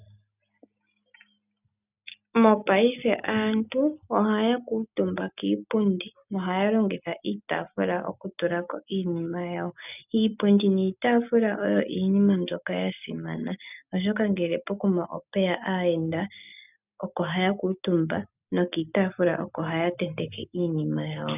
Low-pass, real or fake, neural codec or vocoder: 3.6 kHz; real; none